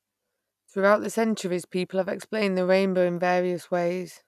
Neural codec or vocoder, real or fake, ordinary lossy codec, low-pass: none; real; none; 14.4 kHz